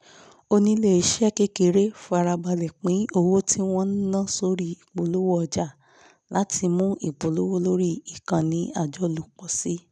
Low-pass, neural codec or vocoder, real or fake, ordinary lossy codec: none; none; real; none